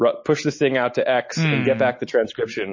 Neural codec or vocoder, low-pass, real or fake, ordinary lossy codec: none; 7.2 kHz; real; MP3, 32 kbps